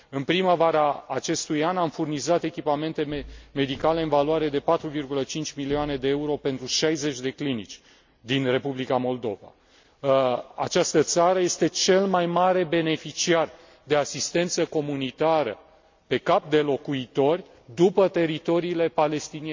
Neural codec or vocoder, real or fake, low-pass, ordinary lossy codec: none; real; 7.2 kHz; MP3, 64 kbps